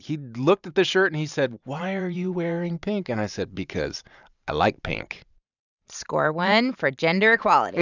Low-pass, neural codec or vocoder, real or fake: 7.2 kHz; vocoder, 44.1 kHz, 128 mel bands every 512 samples, BigVGAN v2; fake